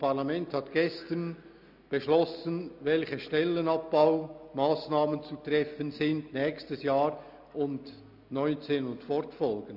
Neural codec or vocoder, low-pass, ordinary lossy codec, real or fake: none; 5.4 kHz; none; real